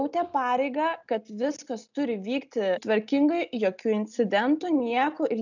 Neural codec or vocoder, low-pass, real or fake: none; 7.2 kHz; real